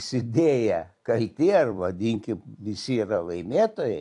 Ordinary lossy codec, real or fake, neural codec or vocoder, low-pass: AAC, 64 kbps; fake; vocoder, 44.1 kHz, 128 mel bands every 256 samples, BigVGAN v2; 10.8 kHz